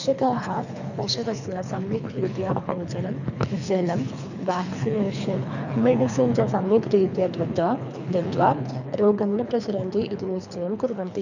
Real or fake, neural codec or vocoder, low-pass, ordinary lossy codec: fake; codec, 24 kHz, 3 kbps, HILCodec; 7.2 kHz; none